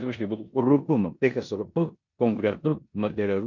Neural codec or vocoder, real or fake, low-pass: codec, 16 kHz in and 24 kHz out, 0.9 kbps, LongCat-Audio-Codec, four codebook decoder; fake; 7.2 kHz